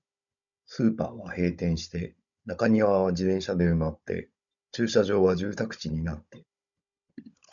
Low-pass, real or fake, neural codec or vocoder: 7.2 kHz; fake; codec, 16 kHz, 16 kbps, FunCodec, trained on Chinese and English, 50 frames a second